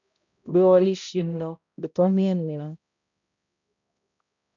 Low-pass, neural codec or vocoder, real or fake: 7.2 kHz; codec, 16 kHz, 0.5 kbps, X-Codec, HuBERT features, trained on balanced general audio; fake